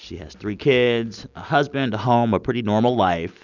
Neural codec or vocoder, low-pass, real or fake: none; 7.2 kHz; real